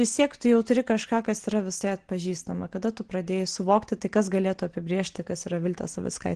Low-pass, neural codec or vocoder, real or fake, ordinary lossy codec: 9.9 kHz; none; real; Opus, 16 kbps